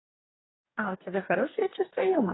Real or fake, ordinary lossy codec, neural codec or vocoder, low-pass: fake; AAC, 16 kbps; codec, 44.1 kHz, 2.6 kbps, DAC; 7.2 kHz